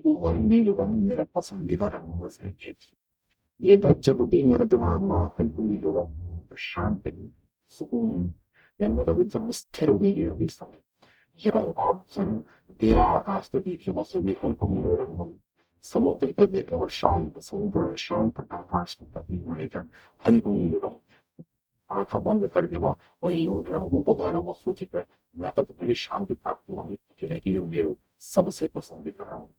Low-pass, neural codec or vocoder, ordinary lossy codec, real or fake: 19.8 kHz; codec, 44.1 kHz, 0.9 kbps, DAC; none; fake